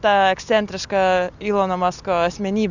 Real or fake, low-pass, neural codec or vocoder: real; 7.2 kHz; none